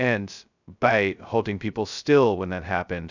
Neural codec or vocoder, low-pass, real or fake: codec, 16 kHz, 0.2 kbps, FocalCodec; 7.2 kHz; fake